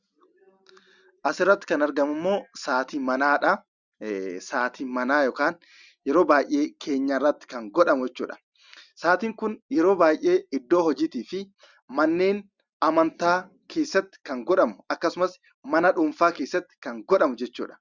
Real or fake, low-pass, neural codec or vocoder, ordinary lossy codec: real; 7.2 kHz; none; Opus, 64 kbps